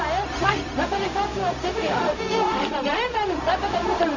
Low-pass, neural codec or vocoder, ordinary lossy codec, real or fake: 7.2 kHz; codec, 16 kHz, 0.4 kbps, LongCat-Audio-Codec; none; fake